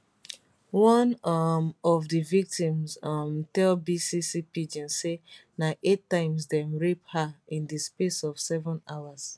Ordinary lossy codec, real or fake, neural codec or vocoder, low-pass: none; real; none; none